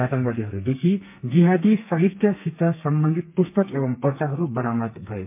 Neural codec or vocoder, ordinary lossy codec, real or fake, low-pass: codec, 32 kHz, 1.9 kbps, SNAC; MP3, 32 kbps; fake; 3.6 kHz